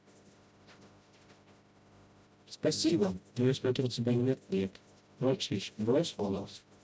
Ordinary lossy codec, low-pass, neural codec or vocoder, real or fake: none; none; codec, 16 kHz, 0.5 kbps, FreqCodec, smaller model; fake